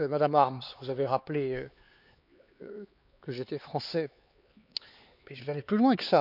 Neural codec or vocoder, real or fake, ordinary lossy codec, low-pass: codec, 16 kHz, 4 kbps, X-Codec, HuBERT features, trained on LibriSpeech; fake; none; 5.4 kHz